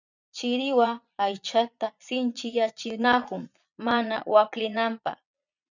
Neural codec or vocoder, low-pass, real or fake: vocoder, 22.05 kHz, 80 mel bands, Vocos; 7.2 kHz; fake